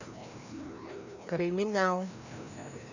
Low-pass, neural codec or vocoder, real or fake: 7.2 kHz; codec, 16 kHz, 1 kbps, FreqCodec, larger model; fake